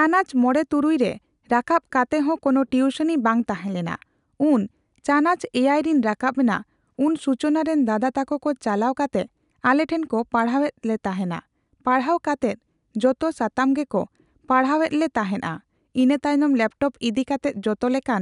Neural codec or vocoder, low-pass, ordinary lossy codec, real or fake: none; 10.8 kHz; none; real